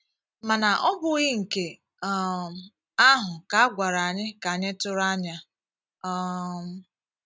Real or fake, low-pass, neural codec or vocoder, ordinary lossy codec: real; none; none; none